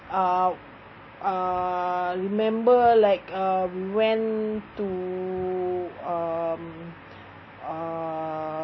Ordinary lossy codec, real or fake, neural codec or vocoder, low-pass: MP3, 24 kbps; real; none; 7.2 kHz